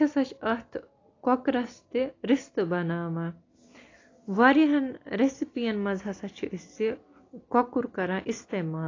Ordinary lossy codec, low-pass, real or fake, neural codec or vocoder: AAC, 32 kbps; 7.2 kHz; real; none